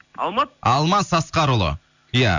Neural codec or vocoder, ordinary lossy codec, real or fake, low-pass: none; none; real; 7.2 kHz